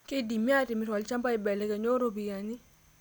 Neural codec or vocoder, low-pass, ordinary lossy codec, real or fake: none; none; none; real